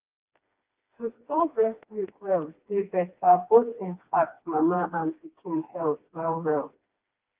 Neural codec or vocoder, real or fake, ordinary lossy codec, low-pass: codec, 16 kHz, 2 kbps, FreqCodec, smaller model; fake; Opus, 32 kbps; 3.6 kHz